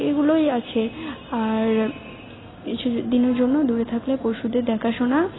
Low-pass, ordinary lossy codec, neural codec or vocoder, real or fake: 7.2 kHz; AAC, 16 kbps; none; real